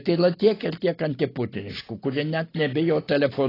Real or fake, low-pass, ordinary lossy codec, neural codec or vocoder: real; 5.4 kHz; AAC, 24 kbps; none